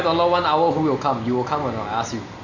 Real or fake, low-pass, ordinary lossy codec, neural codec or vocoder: real; 7.2 kHz; none; none